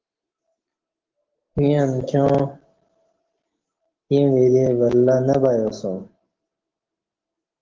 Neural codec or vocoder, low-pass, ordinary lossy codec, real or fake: none; 7.2 kHz; Opus, 16 kbps; real